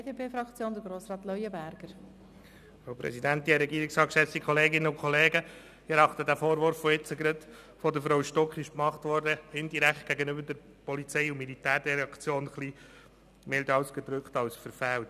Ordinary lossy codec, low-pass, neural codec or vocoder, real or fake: none; 14.4 kHz; none; real